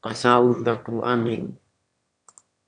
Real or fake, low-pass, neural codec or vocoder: fake; 9.9 kHz; autoencoder, 22.05 kHz, a latent of 192 numbers a frame, VITS, trained on one speaker